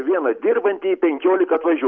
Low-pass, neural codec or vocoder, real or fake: 7.2 kHz; none; real